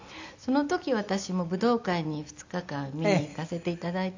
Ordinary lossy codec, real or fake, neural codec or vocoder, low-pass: AAC, 48 kbps; real; none; 7.2 kHz